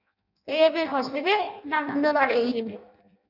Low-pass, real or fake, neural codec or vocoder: 5.4 kHz; fake; codec, 16 kHz in and 24 kHz out, 0.6 kbps, FireRedTTS-2 codec